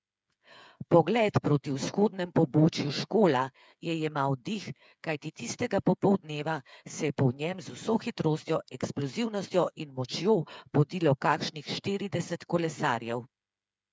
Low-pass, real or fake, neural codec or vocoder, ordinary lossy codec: none; fake; codec, 16 kHz, 8 kbps, FreqCodec, smaller model; none